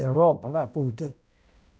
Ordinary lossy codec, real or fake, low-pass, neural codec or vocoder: none; fake; none; codec, 16 kHz, 0.5 kbps, X-Codec, HuBERT features, trained on balanced general audio